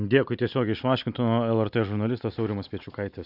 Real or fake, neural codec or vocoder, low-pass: real; none; 5.4 kHz